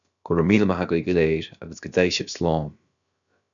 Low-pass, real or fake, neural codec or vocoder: 7.2 kHz; fake; codec, 16 kHz, about 1 kbps, DyCAST, with the encoder's durations